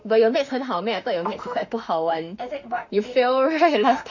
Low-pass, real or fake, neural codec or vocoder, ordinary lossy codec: 7.2 kHz; fake; autoencoder, 48 kHz, 32 numbers a frame, DAC-VAE, trained on Japanese speech; none